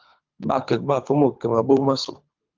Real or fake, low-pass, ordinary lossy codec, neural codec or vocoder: fake; 7.2 kHz; Opus, 24 kbps; codec, 24 kHz, 3 kbps, HILCodec